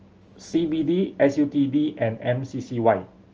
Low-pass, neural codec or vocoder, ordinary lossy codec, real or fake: 7.2 kHz; none; Opus, 24 kbps; real